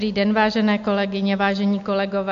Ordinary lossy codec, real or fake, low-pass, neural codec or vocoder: AAC, 64 kbps; real; 7.2 kHz; none